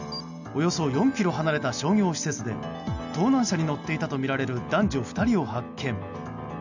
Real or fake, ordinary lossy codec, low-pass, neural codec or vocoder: real; none; 7.2 kHz; none